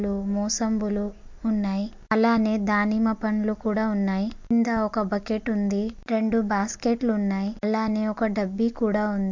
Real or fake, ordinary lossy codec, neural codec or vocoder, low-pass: real; MP3, 48 kbps; none; 7.2 kHz